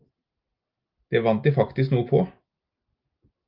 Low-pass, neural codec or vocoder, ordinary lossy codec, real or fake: 5.4 kHz; none; Opus, 32 kbps; real